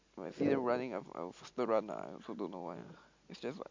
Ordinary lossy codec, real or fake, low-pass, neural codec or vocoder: MP3, 48 kbps; real; 7.2 kHz; none